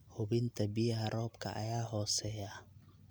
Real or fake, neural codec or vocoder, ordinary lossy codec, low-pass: real; none; none; none